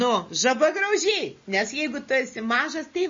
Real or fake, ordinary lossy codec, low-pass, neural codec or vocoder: real; MP3, 32 kbps; 7.2 kHz; none